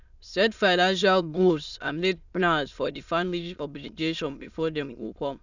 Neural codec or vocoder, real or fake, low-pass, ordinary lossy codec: autoencoder, 22.05 kHz, a latent of 192 numbers a frame, VITS, trained on many speakers; fake; 7.2 kHz; none